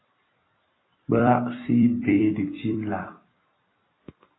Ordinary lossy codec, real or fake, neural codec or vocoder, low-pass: AAC, 16 kbps; fake; vocoder, 44.1 kHz, 128 mel bands every 256 samples, BigVGAN v2; 7.2 kHz